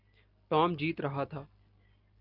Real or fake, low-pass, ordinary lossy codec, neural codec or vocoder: real; 5.4 kHz; Opus, 24 kbps; none